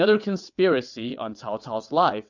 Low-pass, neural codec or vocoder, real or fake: 7.2 kHz; vocoder, 22.05 kHz, 80 mel bands, WaveNeXt; fake